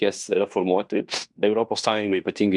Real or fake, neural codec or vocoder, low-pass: fake; codec, 16 kHz in and 24 kHz out, 0.9 kbps, LongCat-Audio-Codec, fine tuned four codebook decoder; 10.8 kHz